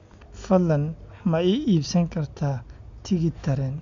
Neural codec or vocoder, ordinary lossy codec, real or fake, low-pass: none; MP3, 64 kbps; real; 7.2 kHz